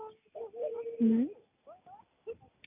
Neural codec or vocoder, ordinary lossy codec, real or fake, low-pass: none; none; real; 3.6 kHz